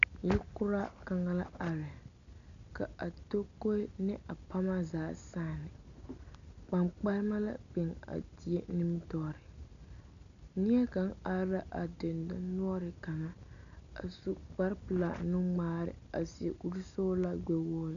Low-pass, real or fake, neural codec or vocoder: 7.2 kHz; real; none